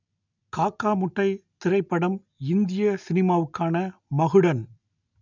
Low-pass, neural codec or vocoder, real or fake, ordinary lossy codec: 7.2 kHz; none; real; none